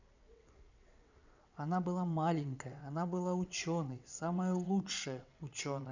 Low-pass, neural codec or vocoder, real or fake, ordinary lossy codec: 7.2 kHz; vocoder, 22.05 kHz, 80 mel bands, WaveNeXt; fake; none